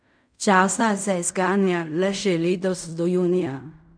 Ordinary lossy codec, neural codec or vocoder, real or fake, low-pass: none; codec, 16 kHz in and 24 kHz out, 0.4 kbps, LongCat-Audio-Codec, fine tuned four codebook decoder; fake; 9.9 kHz